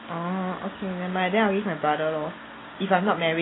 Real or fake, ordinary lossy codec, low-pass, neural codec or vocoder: real; AAC, 16 kbps; 7.2 kHz; none